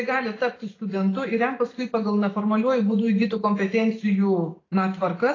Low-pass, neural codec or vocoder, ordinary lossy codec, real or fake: 7.2 kHz; none; AAC, 32 kbps; real